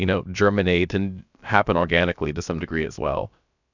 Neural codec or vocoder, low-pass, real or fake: codec, 16 kHz, about 1 kbps, DyCAST, with the encoder's durations; 7.2 kHz; fake